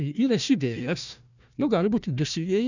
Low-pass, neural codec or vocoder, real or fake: 7.2 kHz; codec, 16 kHz, 1 kbps, FunCodec, trained on Chinese and English, 50 frames a second; fake